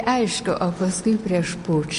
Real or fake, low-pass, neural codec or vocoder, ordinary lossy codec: real; 10.8 kHz; none; MP3, 48 kbps